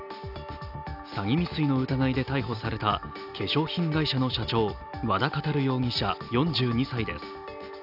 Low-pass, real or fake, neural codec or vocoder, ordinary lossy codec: 5.4 kHz; real; none; none